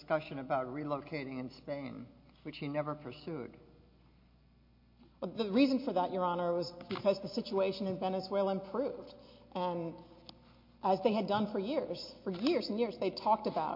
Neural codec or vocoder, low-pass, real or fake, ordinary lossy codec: none; 5.4 kHz; real; MP3, 32 kbps